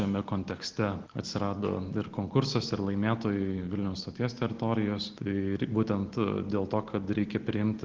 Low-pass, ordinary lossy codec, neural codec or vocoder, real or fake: 7.2 kHz; Opus, 16 kbps; none; real